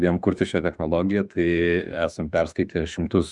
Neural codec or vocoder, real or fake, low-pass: autoencoder, 48 kHz, 32 numbers a frame, DAC-VAE, trained on Japanese speech; fake; 10.8 kHz